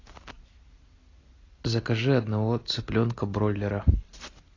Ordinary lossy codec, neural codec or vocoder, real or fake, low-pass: AAC, 32 kbps; none; real; 7.2 kHz